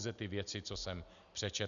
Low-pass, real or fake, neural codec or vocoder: 7.2 kHz; real; none